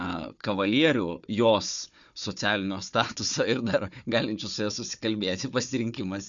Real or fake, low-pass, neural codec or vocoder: fake; 7.2 kHz; codec, 16 kHz, 4 kbps, FunCodec, trained on Chinese and English, 50 frames a second